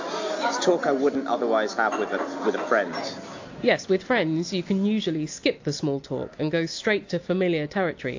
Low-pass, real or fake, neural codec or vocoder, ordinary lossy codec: 7.2 kHz; real; none; AAC, 48 kbps